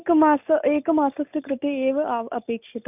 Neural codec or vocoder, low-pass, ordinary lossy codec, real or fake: none; 3.6 kHz; none; real